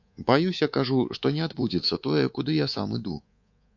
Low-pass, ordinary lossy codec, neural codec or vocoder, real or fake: 7.2 kHz; AAC, 48 kbps; codec, 24 kHz, 3.1 kbps, DualCodec; fake